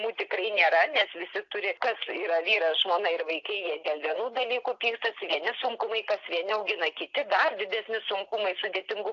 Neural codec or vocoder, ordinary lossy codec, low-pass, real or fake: none; AAC, 48 kbps; 7.2 kHz; real